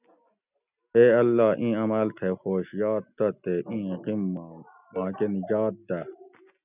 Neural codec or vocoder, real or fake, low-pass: none; real; 3.6 kHz